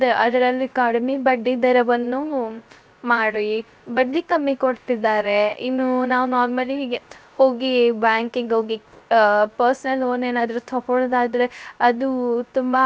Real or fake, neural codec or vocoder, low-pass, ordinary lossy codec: fake; codec, 16 kHz, 0.3 kbps, FocalCodec; none; none